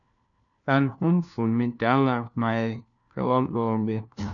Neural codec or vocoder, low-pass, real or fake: codec, 16 kHz, 1 kbps, FunCodec, trained on LibriTTS, 50 frames a second; 7.2 kHz; fake